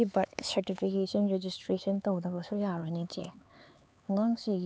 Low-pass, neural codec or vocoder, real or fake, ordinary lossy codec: none; codec, 16 kHz, 4 kbps, X-Codec, HuBERT features, trained on LibriSpeech; fake; none